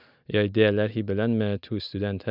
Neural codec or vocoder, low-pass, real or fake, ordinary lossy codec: none; 5.4 kHz; real; none